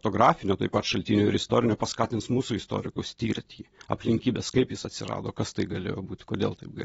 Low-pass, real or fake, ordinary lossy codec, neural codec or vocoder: 19.8 kHz; real; AAC, 24 kbps; none